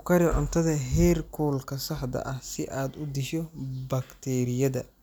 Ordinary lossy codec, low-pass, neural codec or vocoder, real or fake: none; none; none; real